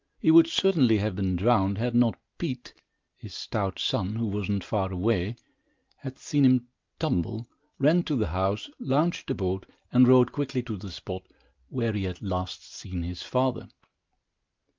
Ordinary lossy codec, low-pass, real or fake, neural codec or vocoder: Opus, 24 kbps; 7.2 kHz; real; none